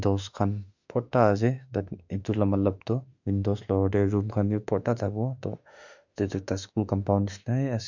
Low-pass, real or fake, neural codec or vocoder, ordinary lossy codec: 7.2 kHz; fake; autoencoder, 48 kHz, 32 numbers a frame, DAC-VAE, trained on Japanese speech; none